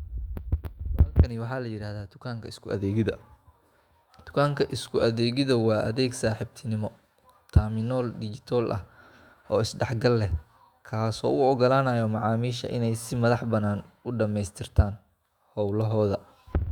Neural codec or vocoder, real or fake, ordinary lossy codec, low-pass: autoencoder, 48 kHz, 128 numbers a frame, DAC-VAE, trained on Japanese speech; fake; Opus, 64 kbps; 19.8 kHz